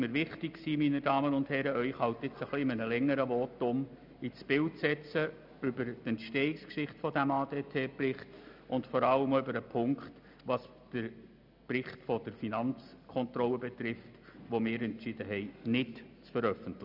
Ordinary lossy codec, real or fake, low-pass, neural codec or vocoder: none; real; 5.4 kHz; none